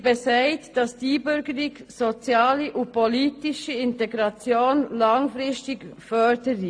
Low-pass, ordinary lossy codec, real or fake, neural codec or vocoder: 9.9 kHz; AAC, 48 kbps; real; none